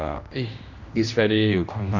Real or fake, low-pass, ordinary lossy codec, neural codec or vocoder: fake; 7.2 kHz; none; codec, 16 kHz, 1 kbps, X-Codec, HuBERT features, trained on general audio